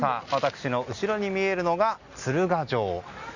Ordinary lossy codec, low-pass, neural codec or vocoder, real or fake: Opus, 64 kbps; 7.2 kHz; none; real